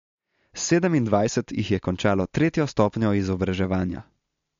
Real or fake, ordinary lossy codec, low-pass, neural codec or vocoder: real; MP3, 48 kbps; 7.2 kHz; none